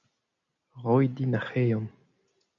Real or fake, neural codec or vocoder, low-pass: real; none; 7.2 kHz